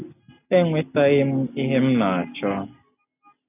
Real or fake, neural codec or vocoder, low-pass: real; none; 3.6 kHz